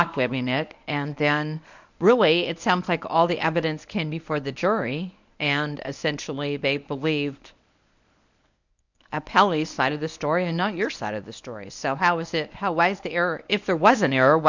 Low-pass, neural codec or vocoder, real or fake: 7.2 kHz; codec, 24 kHz, 0.9 kbps, WavTokenizer, medium speech release version 1; fake